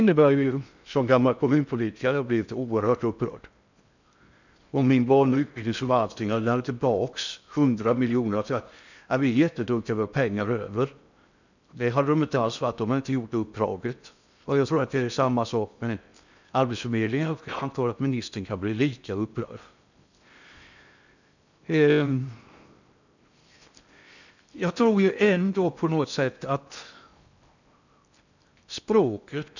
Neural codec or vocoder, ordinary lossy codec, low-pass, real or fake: codec, 16 kHz in and 24 kHz out, 0.6 kbps, FocalCodec, streaming, 4096 codes; none; 7.2 kHz; fake